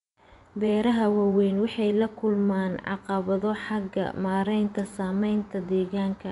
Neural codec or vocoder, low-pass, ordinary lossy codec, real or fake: vocoder, 24 kHz, 100 mel bands, Vocos; 10.8 kHz; none; fake